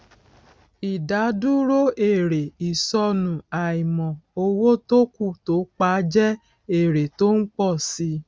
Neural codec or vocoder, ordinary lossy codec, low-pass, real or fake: none; none; none; real